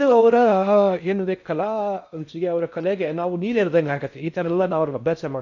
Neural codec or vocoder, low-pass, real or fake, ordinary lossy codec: codec, 16 kHz in and 24 kHz out, 0.6 kbps, FocalCodec, streaming, 4096 codes; 7.2 kHz; fake; none